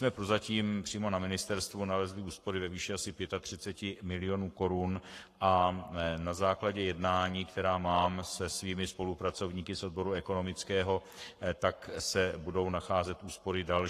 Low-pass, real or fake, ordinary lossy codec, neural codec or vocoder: 14.4 kHz; fake; AAC, 48 kbps; codec, 44.1 kHz, 7.8 kbps, Pupu-Codec